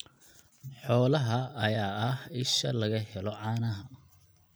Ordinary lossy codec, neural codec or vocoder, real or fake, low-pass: none; none; real; none